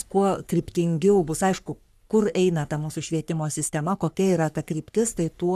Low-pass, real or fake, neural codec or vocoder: 14.4 kHz; fake; codec, 44.1 kHz, 3.4 kbps, Pupu-Codec